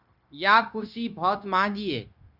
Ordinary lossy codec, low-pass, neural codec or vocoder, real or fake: Opus, 64 kbps; 5.4 kHz; codec, 16 kHz, 0.9 kbps, LongCat-Audio-Codec; fake